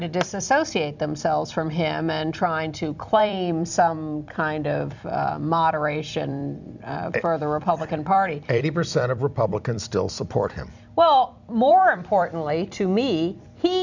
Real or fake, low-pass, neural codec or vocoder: fake; 7.2 kHz; vocoder, 44.1 kHz, 128 mel bands every 256 samples, BigVGAN v2